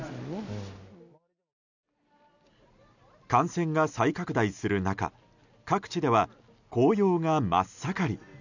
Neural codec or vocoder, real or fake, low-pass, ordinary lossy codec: none; real; 7.2 kHz; none